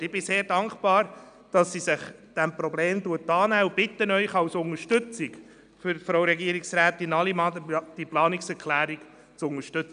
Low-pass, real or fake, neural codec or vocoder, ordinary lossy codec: 9.9 kHz; real; none; none